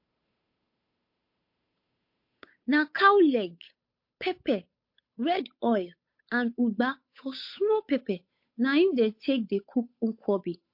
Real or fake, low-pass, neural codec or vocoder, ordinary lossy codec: fake; 5.4 kHz; codec, 16 kHz, 8 kbps, FunCodec, trained on Chinese and English, 25 frames a second; MP3, 32 kbps